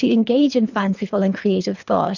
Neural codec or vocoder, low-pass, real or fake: codec, 24 kHz, 3 kbps, HILCodec; 7.2 kHz; fake